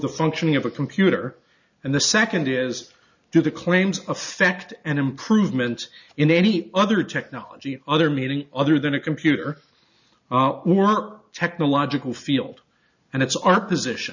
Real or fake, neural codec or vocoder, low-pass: real; none; 7.2 kHz